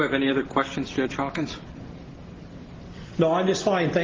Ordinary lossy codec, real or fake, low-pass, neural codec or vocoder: Opus, 16 kbps; real; 7.2 kHz; none